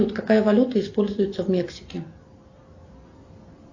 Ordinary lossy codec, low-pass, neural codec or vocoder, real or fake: AAC, 48 kbps; 7.2 kHz; none; real